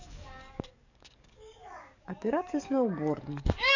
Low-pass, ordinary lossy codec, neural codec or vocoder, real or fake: 7.2 kHz; none; codec, 44.1 kHz, 7.8 kbps, DAC; fake